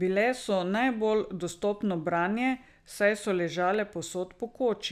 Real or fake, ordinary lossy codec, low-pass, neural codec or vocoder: real; none; 14.4 kHz; none